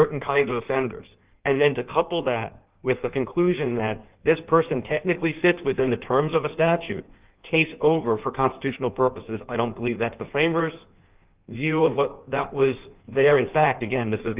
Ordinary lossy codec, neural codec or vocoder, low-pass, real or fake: Opus, 32 kbps; codec, 16 kHz in and 24 kHz out, 1.1 kbps, FireRedTTS-2 codec; 3.6 kHz; fake